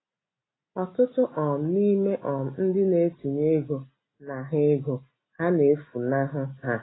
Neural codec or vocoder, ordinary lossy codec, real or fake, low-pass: none; AAC, 16 kbps; real; 7.2 kHz